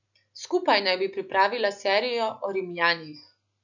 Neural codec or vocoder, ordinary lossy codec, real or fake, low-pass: none; none; real; 7.2 kHz